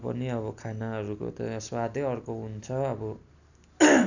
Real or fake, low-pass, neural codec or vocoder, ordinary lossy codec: real; 7.2 kHz; none; none